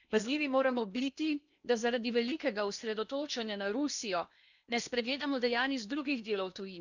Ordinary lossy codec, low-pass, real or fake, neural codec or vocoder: none; 7.2 kHz; fake; codec, 16 kHz in and 24 kHz out, 0.8 kbps, FocalCodec, streaming, 65536 codes